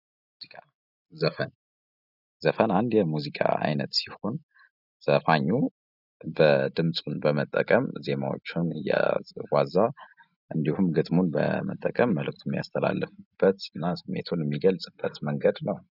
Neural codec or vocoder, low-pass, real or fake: none; 5.4 kHz; real